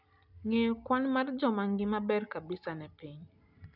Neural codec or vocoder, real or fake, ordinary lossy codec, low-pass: none; real; none; 5.4 kHz